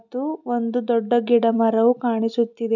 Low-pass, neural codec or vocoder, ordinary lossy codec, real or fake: 7.2 kHz; none; none; real